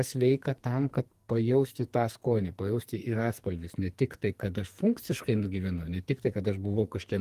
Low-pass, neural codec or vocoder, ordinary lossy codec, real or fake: 14.4 kHz; codec, 44.1 kHz, 2.6 kbps, SNAC; Opus, 24 kbps; fake